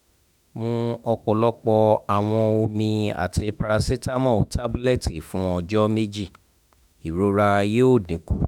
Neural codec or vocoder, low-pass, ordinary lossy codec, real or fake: autoencoder, 48 kHz, 32 numbers a frame, DAC-VAE, trained on Japanese speech; 19.8 kHz; none; fake